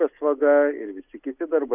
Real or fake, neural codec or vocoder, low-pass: real; none; 3.6 kHz